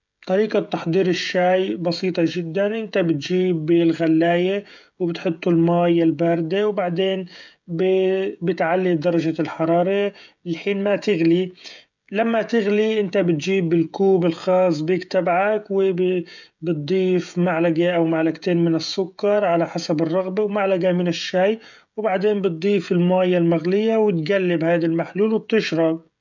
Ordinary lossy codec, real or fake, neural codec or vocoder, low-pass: none; fake; codec, 16 kHz, 16 kbps, FreqCodec, smaller model; 7.2 kHz